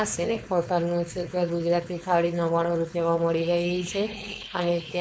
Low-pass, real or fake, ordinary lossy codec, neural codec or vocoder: none; fake; none; codec, 16 kHz, 4.8 kbps, FACodec